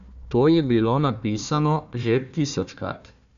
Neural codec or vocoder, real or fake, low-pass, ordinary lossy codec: codec, 16 kHz, 1 kbps, FunCodec, trained on Chinese and English, 50 frames a second; fake; 7.2 kHz; AAC, 96 kbps